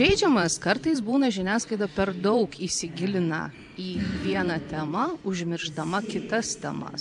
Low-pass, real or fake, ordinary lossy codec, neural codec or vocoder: 10.8 kHz; fake; MP3, 96 kbps; vocoder, 44.1 kHz, 128 mel bands every 512 samples, BigVGAN v2